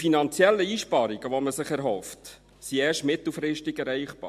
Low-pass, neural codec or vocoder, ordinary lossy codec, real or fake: 14.4 kHz; none; MP3, 64 kbps; real